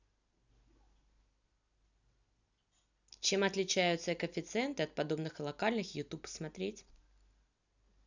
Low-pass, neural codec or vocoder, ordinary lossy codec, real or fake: 7.2 kHz; none; none; real